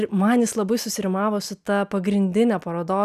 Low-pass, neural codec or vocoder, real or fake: 14.4 kHz; none; real